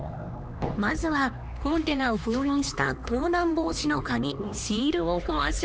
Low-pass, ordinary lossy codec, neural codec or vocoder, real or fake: none; none; codec, 16 kHz, 2 kbps, X-Codec, HuBERT features, trained on LibriSpeech; fake